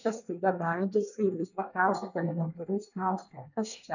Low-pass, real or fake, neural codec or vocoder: 7.2 kHz; fake; codec, 24 kHz, 1 kbps, SNAC